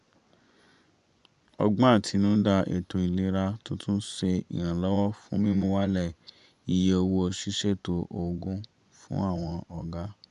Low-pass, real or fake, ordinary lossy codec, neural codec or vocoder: 10.8 kHz; fake; none; vocoder, 24 kHz, 100 mel bands, Vocos